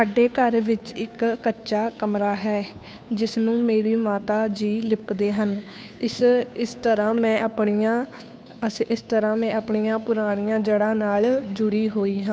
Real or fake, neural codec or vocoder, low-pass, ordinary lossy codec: fake; codec, 16 kHz, 2 kbps, FunCodec, trained on Chinese and English, 25 frames a second; none; none